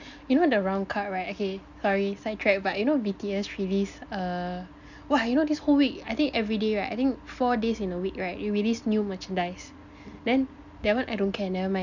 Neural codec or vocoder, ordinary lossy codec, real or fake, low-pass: none; none; real; 7.2 kHz